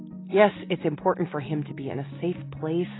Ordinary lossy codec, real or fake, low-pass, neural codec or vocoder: AAC, 16 kbps; real; 7.2 kHz; none